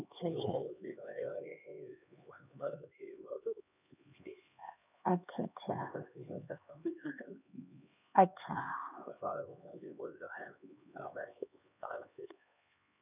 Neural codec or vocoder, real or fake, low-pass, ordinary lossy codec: codec, 16 kHz, 2 kbps, X-Codec, HuBERT features, trained on LibriSpeech; fake; 3.6 kHz; none